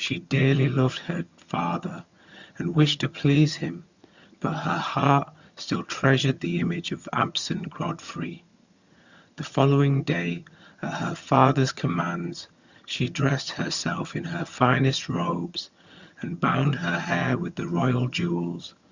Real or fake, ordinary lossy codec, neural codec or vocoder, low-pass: fake; Opus, 64 kbps; vocoder, 22.05 kHz, 80 mel bands, HiFi-GAN; 7.2 kHz